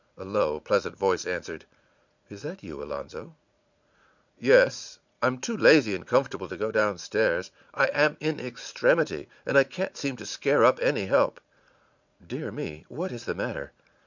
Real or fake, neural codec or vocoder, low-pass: fake; vocoder, 44.1 kHz, 80 mel bands, Vocos; 7.2 kHz